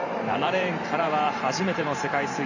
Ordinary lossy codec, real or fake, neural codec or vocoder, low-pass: none; fake; vocoder, 44.1 kHz, 128 mel bands every 512 samples, BigVGAN v2; 7.2 kHz